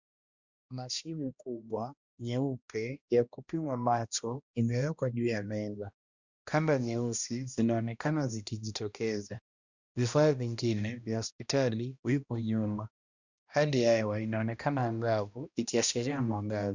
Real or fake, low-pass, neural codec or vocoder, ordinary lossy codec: fake; 7.2 kHz; codec, 16 kHz, 1 kbps, X-Codec, HuBERT features, trained on balanced general audio; Opus, 64 kbps